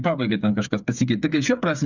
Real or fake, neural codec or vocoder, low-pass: fake; codec, 16 kHz, 8 kbps, FreqCodec, smaller model; 7.2 kHz